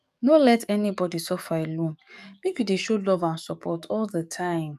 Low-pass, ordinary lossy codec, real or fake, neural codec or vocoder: 14.4 kHz; none; fake; codec, 44.1 kHz, 7.8 kbps, DAC